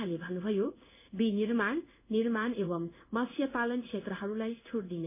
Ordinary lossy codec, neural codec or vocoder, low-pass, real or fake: MP3, 24 kbps; codec, 16 kHz in and 24 kHz out, 1 kbps, XY-Tokenizer; 3.6 kHz; fake